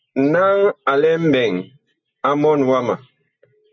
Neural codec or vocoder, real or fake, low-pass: none; real; 7.2 kHz